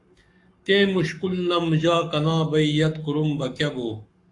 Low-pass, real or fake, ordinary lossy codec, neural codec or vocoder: 10.8 kHz; fake; AAC, 64 kbps; codec, 44.1 kHz, 7.8 kbps, Pupu-Codec